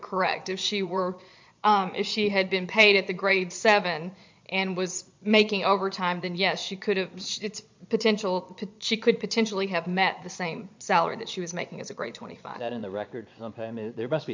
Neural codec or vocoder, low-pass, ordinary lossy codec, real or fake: vocoder, 22.05 kHz, 80 mel bands, Vocos; 7.2 kHz; MP3, 64 kbps; fake